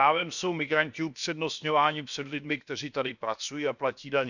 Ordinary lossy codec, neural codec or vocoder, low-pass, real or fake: none; codec, 16 kHz, about 1 kbps, DyCAST, with the encoder's durations; 7.2 kHz; fake